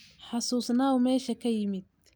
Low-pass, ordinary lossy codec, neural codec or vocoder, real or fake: none; none; none; real